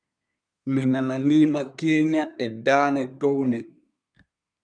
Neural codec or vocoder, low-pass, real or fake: codec, 24 kHz, 1 kbps, SNAC; 9.9 kHz; fake